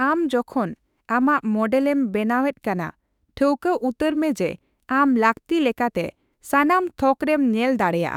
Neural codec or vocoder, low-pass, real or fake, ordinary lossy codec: autoencoder, 48 kHz, 32 numbers a frame, DAC-VAE, trained on Japanese speech; 19.8 kHz; fake; none